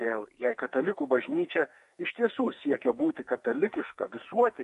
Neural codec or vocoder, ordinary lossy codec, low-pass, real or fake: codec, 44.1 kHz, 2.6 kbps, SNAC; MP3, 48 kbps; 14.4 kHz; fake